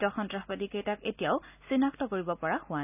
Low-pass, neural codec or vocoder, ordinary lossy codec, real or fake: 3.6 kHz; none; none; real